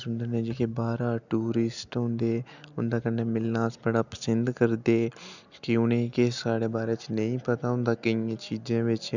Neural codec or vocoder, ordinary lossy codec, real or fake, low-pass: none; none; real; 7.2 kHz